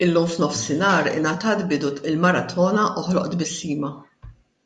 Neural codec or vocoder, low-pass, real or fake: none; 10.8 kHz; real